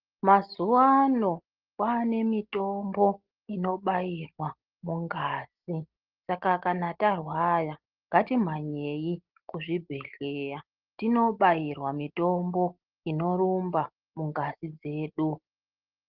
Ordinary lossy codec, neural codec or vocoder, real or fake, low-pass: Opus, 16 kbps; none; real; 5.4 kHz